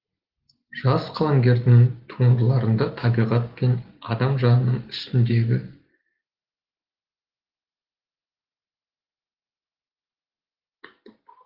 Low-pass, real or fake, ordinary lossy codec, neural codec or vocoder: 5.4 kHz; real; Opus, 16 kbps; none